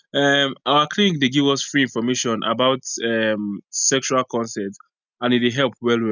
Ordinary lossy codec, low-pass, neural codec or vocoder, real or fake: none; 7.2 kHz; none; real